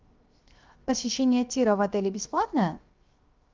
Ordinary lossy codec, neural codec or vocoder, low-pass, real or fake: Opus, 24 kbps; codec, 16 kHz, 0.7 kbps, FocalCodec; 7.2 kHz; fake